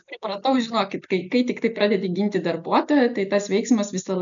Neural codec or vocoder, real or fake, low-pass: none; real; 7.2 kHz